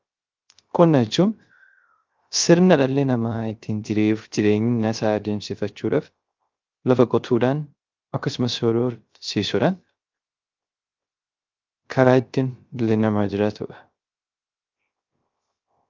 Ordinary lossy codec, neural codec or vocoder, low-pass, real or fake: Opus, 24 kbps; codec, 16 kHz, 0.3 kbps, FocalCodec; 7.2 kHz; fake